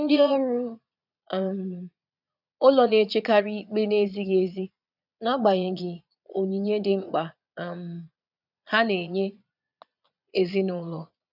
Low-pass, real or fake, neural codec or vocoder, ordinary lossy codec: 5.4 kHz; fake; vocoder, 22.05 kHz, 80 mel bands, Vocos; none